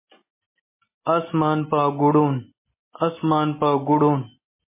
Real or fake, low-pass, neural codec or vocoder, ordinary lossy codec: real; 3.6 kHz; none; MP3, 16 kbps